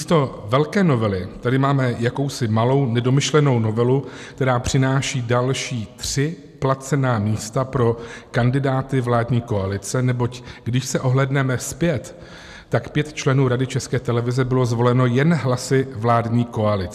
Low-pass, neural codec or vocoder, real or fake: 14.4 kHz; none; real